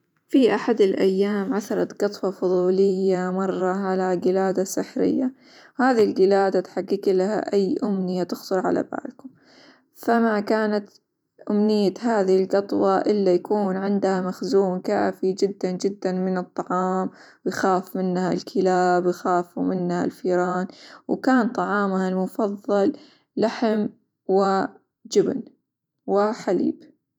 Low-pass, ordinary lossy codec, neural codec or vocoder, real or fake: 19.8 kHz; none; vocoder, 44.1 kHz, 128 mel bands every 512 samples, BigVGAN v2; fake